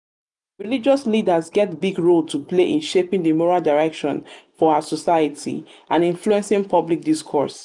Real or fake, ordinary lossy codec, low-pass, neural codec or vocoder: real; none; 10.8 kHz; none